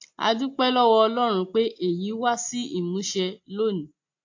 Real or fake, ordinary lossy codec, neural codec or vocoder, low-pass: real; AAC, 48 kbps; none; 7.2 kHz